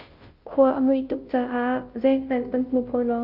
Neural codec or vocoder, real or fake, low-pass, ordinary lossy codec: codec, 16 kHz, 0.5 kbps, FunCodec, trained on Chinese and English, 25 frames a second; fake; 5.4 kHz; Opus, 24 kbps